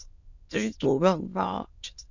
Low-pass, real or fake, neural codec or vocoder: 7.2 kHz; fake; autoencoder, 22.05 kHz, a latent of 192 numbers a frame, VITS, trained on many speakers